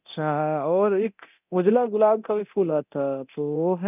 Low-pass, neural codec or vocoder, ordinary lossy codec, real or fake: 3.6 kHz; codec, 24 kHz, 0.9 kbps, DualCodec; none; fake